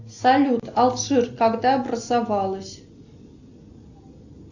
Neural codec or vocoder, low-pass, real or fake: none; 7.2 kHz; real